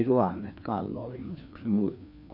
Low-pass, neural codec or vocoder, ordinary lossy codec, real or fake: 5.4 kHz; codec, 16 kHz, 2 kbps, FreqCodec, larger model; none; fake